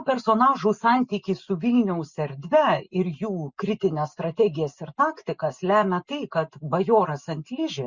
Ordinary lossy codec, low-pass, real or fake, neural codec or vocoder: Opus, 64 kbps; 7.2 kHz; real; none